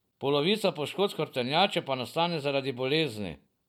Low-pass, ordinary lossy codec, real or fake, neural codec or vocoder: 19.8 kHz; none; real; none